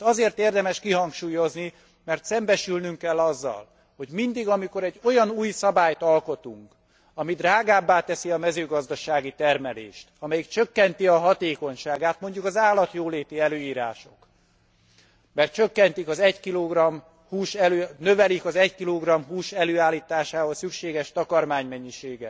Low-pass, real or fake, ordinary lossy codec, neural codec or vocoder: none; real; none; none